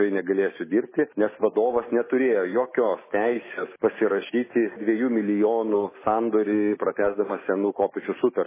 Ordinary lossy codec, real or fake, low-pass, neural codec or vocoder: MP3, 16 kbps; real; 3.6 kHz; none